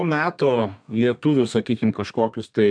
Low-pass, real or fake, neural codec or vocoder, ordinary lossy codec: 9.9 kHz; fake; codec, 32 kHz, 1.9 kbps, SNAC; MP3, 96 kbps